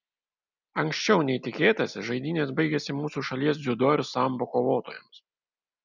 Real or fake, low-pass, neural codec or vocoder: real; 7.2 kHz; none